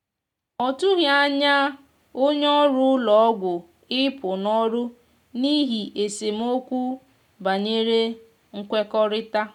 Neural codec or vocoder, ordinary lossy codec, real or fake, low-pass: none; none; real; 19.8 kHz